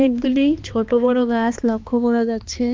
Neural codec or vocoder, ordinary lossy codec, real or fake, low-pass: codec, 16 kHz, 2 kbps, X-Codec, HuBERT features, trained on balanced general audio; Opus, 32 kbps; fake; 7.2 kHz